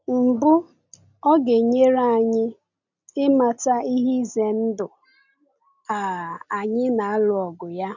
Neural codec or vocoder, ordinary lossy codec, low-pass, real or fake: none; none; 7.2 kHz; real